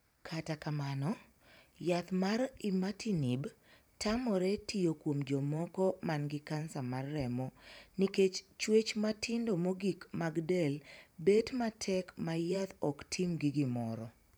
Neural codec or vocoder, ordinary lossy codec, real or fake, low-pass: vocoder, 44.1 kHz, 128 mel bands every 512 samples, BigVGAN v2; none; fake; none